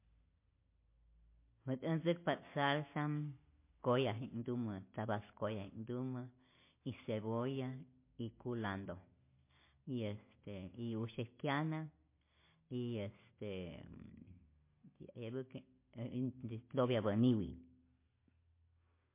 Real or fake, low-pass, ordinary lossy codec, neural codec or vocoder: real; 3.6 kHz; MP3, 24 kbps; none